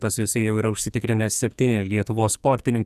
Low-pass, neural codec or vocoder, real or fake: 14.4 kHz; codec, 44.1 kHz, 2.6 kbps, SNAC; fake